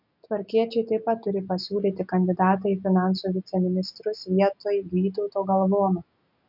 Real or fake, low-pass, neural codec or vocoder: real; 5.4 kHz; none